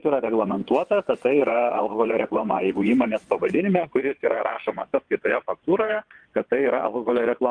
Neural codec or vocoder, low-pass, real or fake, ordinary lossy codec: vocoder, 22.05 kHz, 80 mel bands, WaveNeXt; 9.9 kHz; fake; Opus, 24 kbps